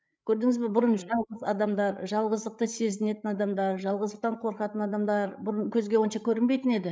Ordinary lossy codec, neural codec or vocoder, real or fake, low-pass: none; codec, 16 kHz, 8 kbps, FreqCodec, larger model; fake; none